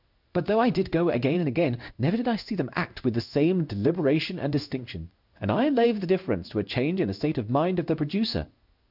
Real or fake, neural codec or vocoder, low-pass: fake; codec, 16 kHz in and 24 kHz out, 1 kbps, XY-Tokenizer; 5.4 kHz